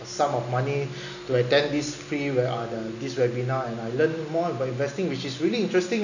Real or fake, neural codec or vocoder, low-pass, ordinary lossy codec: real; none; 7.2 kHz; none